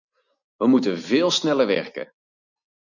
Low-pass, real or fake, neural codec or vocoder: 7.2 kHz; real; none